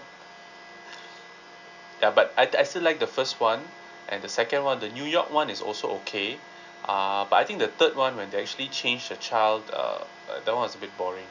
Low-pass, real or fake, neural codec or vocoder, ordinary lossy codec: 7.2 kHz; real; none; none